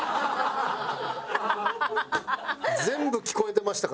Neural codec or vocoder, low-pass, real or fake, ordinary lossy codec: none; none; real; none